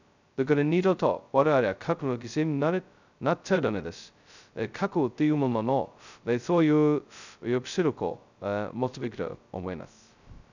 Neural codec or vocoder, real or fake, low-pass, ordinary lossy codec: codec, 16 kHz, 0.2 kbps, FocalCodec; fake; 7.2 kHz; none